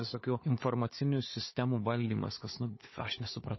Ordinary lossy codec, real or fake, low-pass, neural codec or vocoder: MP3, 24 kbps; fake; 7.2 kHz; codec, 16 kHz, 4 kbps, FunCodec, trained on Chinese and English, 50 frames a second